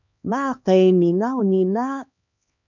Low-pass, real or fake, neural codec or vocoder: 7.2 kHz; fake; codec, 16 kHz, 2 kbps, X-Codec, HuBERT features, trained on LibriSpeech